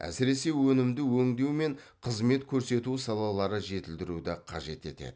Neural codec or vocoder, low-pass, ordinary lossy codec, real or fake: none; none; none; real